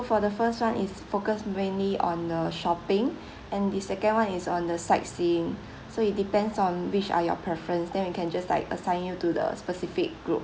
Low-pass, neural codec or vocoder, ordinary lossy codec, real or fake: none; none; none; real